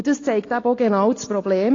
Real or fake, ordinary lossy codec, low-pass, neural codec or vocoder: real; AAC, 32 kbps; 7.2 kHz; none